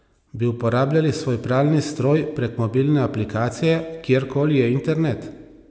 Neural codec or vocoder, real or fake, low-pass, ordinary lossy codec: none; real; none; none